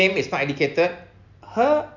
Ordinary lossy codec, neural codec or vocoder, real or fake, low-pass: none; none; real; 7.2 kHz